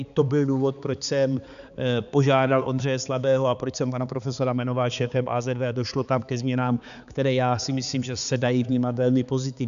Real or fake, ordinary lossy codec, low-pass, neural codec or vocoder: fake; MP3, 96 kbps; 7.2 kHz; codec, 16 kHz, 4 kbps, X-Codec, HuBERT features, trained on balanced general audio